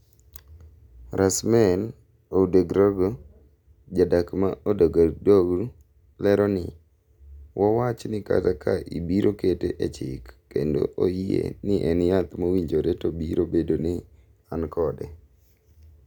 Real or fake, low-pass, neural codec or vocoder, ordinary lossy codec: real; 19.8 kHz; none; none